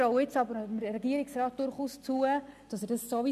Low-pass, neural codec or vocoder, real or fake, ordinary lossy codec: 14.4 kHz; none; real; none